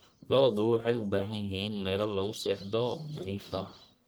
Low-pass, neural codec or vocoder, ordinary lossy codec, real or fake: none; codec, 44.1 kHz, 1.7 kbps, Pupu-Codec; none; fake